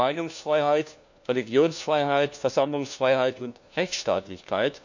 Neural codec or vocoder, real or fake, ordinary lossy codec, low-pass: codec, 16 kHz, 1 kbps, FunCodec, trained on LibriTTS, 50 frames a second; fake; none; 7.2 kHz